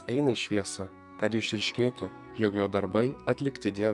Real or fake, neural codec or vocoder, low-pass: fake; codec, 44.1 kHz, 2.6 kbps, SNAC; 10.8 kHz